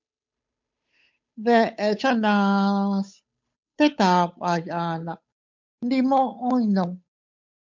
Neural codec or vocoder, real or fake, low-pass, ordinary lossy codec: codec, 16 kHz, 8 kbps, FunCodec, trained on Chinese and English, 25 frames a second; fake; 7.2 kHz; MP3, 64 kbps